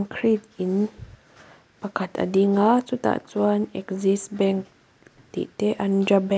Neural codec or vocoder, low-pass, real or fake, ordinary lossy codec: none; none; real; none